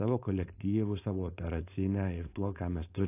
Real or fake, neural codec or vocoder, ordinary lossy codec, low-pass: fake; codec, 16 kHz, 4.8 kbps, FACodec; AAC, 24 kbps; 3.6 kHz